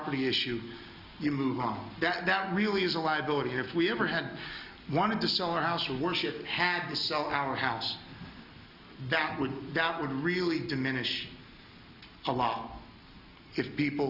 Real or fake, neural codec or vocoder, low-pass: real; none; 5.4 kHz